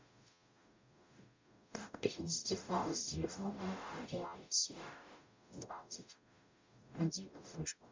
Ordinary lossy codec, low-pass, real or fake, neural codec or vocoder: MP3, 64 kbps; 7.2 kHz; fake; codec, 44.1 kHz, 0.9 kbps, DAC